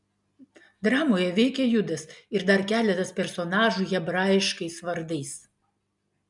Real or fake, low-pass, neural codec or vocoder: real; 10.8 kHz; none